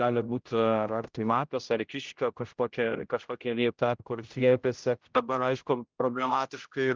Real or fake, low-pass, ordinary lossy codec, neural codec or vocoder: fake; 7.2 kHz; Opus, 24 kbps; codec, 16 kHz, 0.5 kbps, X-Codec, HuBERT features, trained on general audio